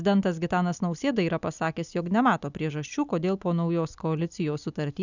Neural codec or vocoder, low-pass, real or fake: none; 7.2 kHz; real